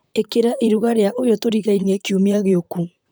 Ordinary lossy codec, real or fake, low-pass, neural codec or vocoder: none; fake; none; vocoder, 44.1 kHz, 128 mel bands, Pupu-Vocoder